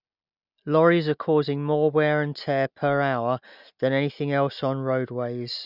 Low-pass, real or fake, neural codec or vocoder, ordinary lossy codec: 5.4 kHz; real; none; none